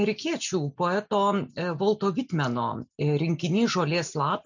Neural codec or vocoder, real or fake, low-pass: none; real; 7.2 kHz